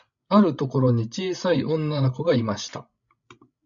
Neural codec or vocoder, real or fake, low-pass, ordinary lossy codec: codec, 16 kHz, 16 kbps, FreqCodec, larger model; fake; 7.2 kHz; MP3, 96 kbps